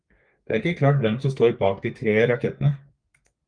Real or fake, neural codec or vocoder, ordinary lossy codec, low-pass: fake; codec, 32 kHz, 1.9 kbps, SNAC; Opus, 32 kbps; 9.9 kHz